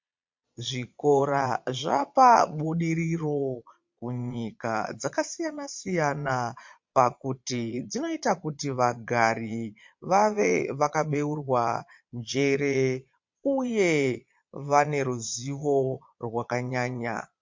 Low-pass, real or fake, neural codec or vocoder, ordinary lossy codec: 7.2 kHz; fake; vocoder, 22.05 kHz, 80 mel bands, Vocos; MP3, 48 kbps